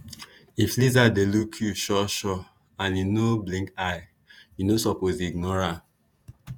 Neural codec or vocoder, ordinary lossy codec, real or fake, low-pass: vocoder, 48 kHz, 128 mel bands, Vocos; none; fake; none